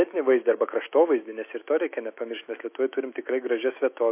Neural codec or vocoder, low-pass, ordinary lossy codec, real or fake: autoencoder, 48 kHz, 128 numbers a frame, DAC-VAE, trained on Japanese speech; 3.6 kHz; MP3, 24 kbps; fake